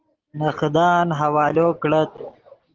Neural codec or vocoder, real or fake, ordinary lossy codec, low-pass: none; real; Opus, 16 kbps; 7.2 kHz